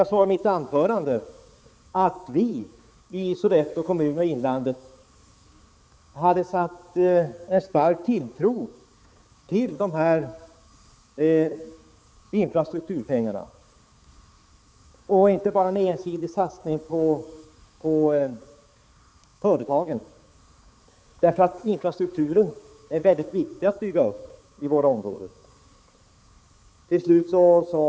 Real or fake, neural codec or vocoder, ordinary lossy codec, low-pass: fake; codec, 16 kHz, 4 kbps, X-Codec, HuBERT features, trained on balanced general audio; none; none